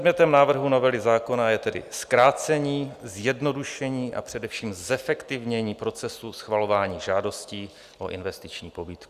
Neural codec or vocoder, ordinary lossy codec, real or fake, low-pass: none; Opus, 64 kbps; real; 14.4 kHz